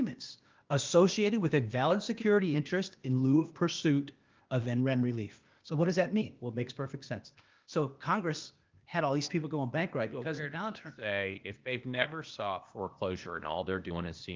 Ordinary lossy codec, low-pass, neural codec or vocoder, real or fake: Opus, 32 kbps; 7.2 kHz; codec, 16 kHz, 0.8 kbps, ZipCodec; fake